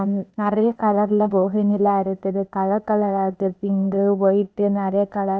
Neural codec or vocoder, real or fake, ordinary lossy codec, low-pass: codec, 16 kHz, 0.8 kbps, ZipCodec; fake; none; none